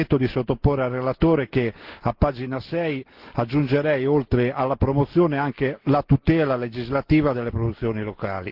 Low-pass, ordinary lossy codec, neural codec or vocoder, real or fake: 5.4 kHz; Opus, 16 kbps; none; real